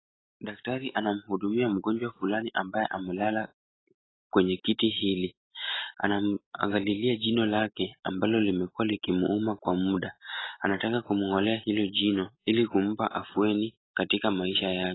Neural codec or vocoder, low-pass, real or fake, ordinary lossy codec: none; 7.2 kHz; real; AAC, 16 kbps